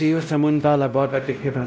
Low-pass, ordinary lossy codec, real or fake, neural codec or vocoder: none; none; fake; codec, 16 kHz, 0.5 kbps, X-Codec, WavLM features, trained on Multilingual LibriSpeech